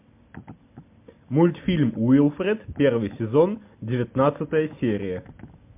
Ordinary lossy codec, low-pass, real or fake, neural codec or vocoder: MP3, 32 kbps; 3.6 kHz; real; none